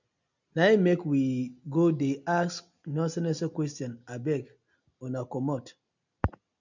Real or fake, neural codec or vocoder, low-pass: real; none; 7.2 kHz